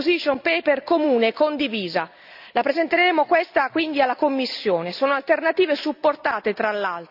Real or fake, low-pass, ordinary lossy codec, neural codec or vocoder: real; 5.4 kHz; none; none